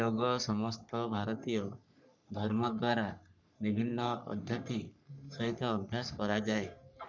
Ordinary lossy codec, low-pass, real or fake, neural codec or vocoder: none; 7.2 kHz; fake; codec, 44.1 kHz, 3.4 kbps, Pupu-Codec